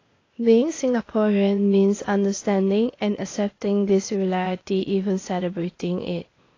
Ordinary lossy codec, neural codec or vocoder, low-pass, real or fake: AAC, 32 kbps; codec, 16 kHz, 0.8 kbps, ZipCodec; 7.2 kHz; fake